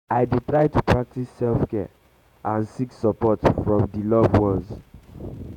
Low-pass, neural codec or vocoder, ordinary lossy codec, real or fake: 19.8 kHz; vocoder, 48 kHz, 128 mel bands, Vocos; none; fake